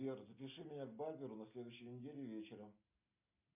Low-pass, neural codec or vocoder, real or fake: 3.6 kHz; none; real